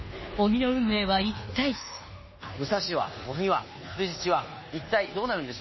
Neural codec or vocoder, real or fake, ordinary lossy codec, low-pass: codec, 24 kHz, 1.2 kbps, DualCodec; fake; MP3, 24 kbps; 7.2 kHz